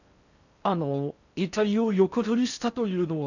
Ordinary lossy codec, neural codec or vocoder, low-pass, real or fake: none; codec, 16 kHz in and 24 kHz out, 0.6 kbps, FocalCodec, streaming, 4096 codes; 7.2 kHz; fake